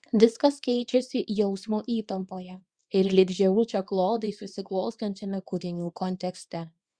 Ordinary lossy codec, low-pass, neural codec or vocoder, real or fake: Opus, 64 kbps; 9.9 kHz; codec, 24 kHz, 0.9 kbps, WavTokenizer, small release; fake